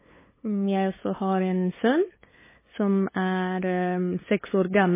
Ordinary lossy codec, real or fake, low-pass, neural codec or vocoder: MP3, 16 kbps; fake; 3.6 kHz; codec, 16 kHz, 8 kbps, FunCodec, trained on LibriTTS, 25 frames a second